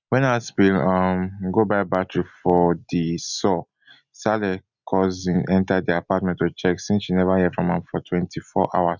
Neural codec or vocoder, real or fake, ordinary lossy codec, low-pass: none; real; none; 7.2 kHz